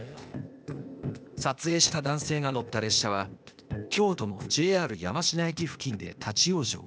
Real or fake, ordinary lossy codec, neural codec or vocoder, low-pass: fake; none; codec, 16 kHz, 0.8 kbps, ZipCodec; none